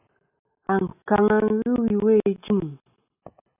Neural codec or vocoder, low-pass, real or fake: none; 3.6 kHz; real